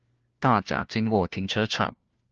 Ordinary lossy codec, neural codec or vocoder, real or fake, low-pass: Opus, 16 kbps; codec, 16 kHz, 1 kbps, FunCodec, trained on LibriTTS, 50 frames a second; fake; 7.2 kHz